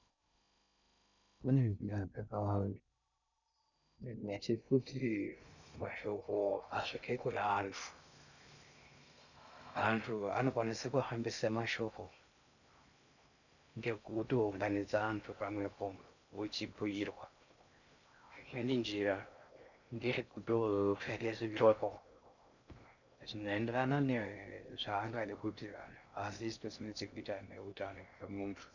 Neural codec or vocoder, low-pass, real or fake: codec, 16 kHz in and 24 kHz out, 0.6 kbps, FocalCodec, streaming, 2048 codes; 7.2 kHz; fake